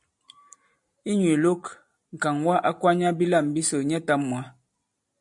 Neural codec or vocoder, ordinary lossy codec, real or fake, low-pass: none; MP3, 64 kbps; real; 10.8 kHz